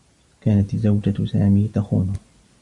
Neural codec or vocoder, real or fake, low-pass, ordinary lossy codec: none; real; 10.8 kHz; Opus, 64 kbps